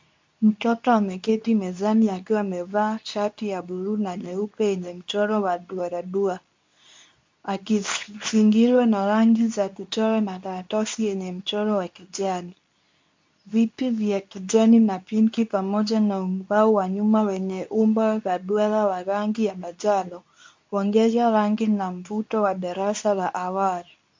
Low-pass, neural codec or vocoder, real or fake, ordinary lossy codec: 7.2 kHz; codec, 24 kHz, 0.9 kbps, WavTokenizer, medium speech release version 2; fake; MP3, 64 kbps